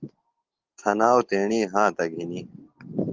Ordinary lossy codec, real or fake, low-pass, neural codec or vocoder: Opus, 16 kbps; real; 7.2 kHz; none